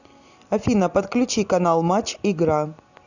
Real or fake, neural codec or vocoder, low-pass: real; none; 7.2 kHz